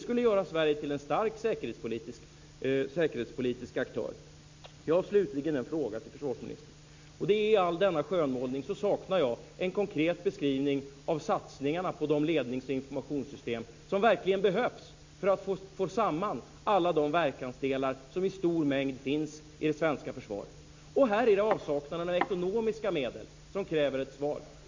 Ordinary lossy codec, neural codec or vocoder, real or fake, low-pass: MP3, 64 kbps; none; real; 7.2 kHz